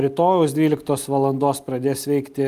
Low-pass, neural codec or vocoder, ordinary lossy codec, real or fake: 14.4 kHz; none; Opus, 32 kbps; real